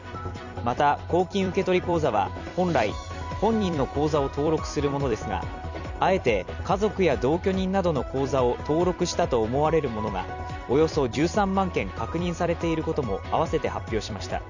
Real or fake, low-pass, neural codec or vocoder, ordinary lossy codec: fake; 7.2 kHz; vocoder, 44.1 kHz, 128 mel bands every 256 samples, BigVGAN v2; none